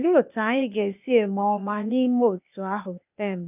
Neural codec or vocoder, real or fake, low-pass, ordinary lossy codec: codec, 16 kHz, 0.8 kbps, ZipCodec; fake; 3.6 kHz; none